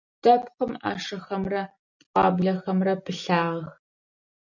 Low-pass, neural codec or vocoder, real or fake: 7.2 kHz; none; real